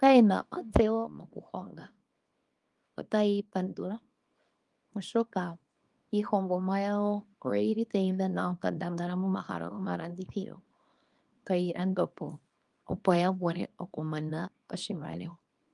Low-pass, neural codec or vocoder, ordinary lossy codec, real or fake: 10.8 kHz; codec, 24 kHz, 0.9 kbps, WavTokenizer, small release; Opus, 32 kbps; fake